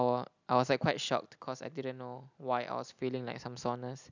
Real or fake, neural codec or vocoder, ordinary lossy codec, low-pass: real; none; none; 7.2 kHz